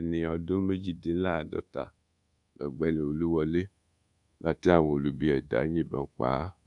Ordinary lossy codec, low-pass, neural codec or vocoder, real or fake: none; 10.8 kHz; codec, 24 kHz, 1.2 kbps, DualCodec; fake